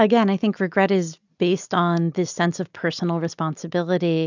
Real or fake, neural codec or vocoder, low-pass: real; none; 7.2 kHz